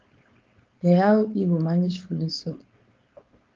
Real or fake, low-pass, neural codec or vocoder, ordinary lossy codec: fake; 7.2 kHz; codec, 16 kHz, 4.8 kbps, FACodec; Opus, 24 kbps